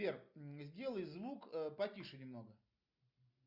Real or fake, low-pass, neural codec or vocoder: real; 5.4 kHz; none